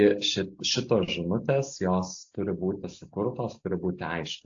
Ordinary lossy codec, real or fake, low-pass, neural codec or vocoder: AAC, 48 kbps; real; 7.2 kHz; none